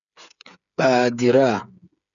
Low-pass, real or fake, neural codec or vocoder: 7.2 kHz; fake; codec, 16 kHz, 8 kbps, FreqCodec, smaller model